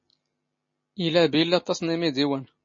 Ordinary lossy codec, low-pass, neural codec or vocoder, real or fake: MP3, 32 kbps; 7.2 kHz; none; real